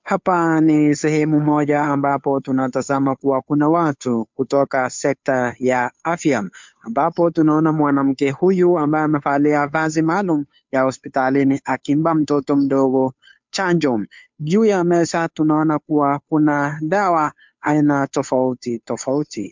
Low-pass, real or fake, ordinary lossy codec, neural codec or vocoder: 7.2 kHz; fake; MP3, 64 kbps; codec, 16 kHz, 2 kbps, FunCodec, trained on Chinese and English, 25 frames a second